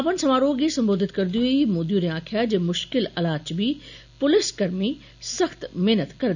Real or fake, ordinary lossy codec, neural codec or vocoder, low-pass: real; none; none; 7.2 kHz